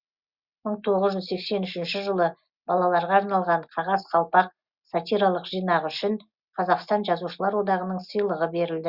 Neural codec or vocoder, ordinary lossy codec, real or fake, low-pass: none; Opus, 64 kbps; real; 5.4 kHz